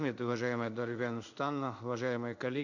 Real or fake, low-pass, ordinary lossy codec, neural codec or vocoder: fake; 7.2 kHz; none; codec, 16 kHz in and 24 kHz out, 1 kbps, XY-Tokenizer